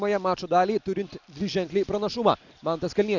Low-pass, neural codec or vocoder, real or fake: 7.2 kHz; none; real